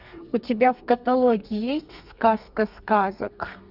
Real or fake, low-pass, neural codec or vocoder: fake; 5.4 kHz; codec, 32 kHz, 1.9 kbps, SNAC